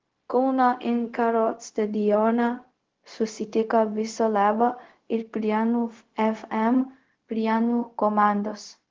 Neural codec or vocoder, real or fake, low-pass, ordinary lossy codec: codec, 16 kHz, 0.4 kbps, LongCat-Audio-Codec; fake; 7.2 kHz; Opus, 16 kbps